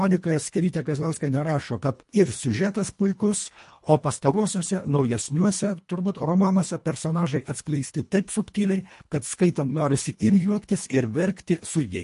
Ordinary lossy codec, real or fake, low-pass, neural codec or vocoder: MP3, 48 kbps; fake; 10.8 kHz; codec, 24 kHz, 1.5 kbps, HILCodec